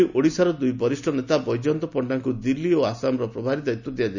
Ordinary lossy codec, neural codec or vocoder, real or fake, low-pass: none; none; real; 7.2 kHz